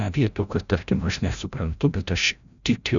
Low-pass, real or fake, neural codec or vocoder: 7.2 kHz; fake; codec, 16 kHz, 0.5 kbps, FreqCodec, larger model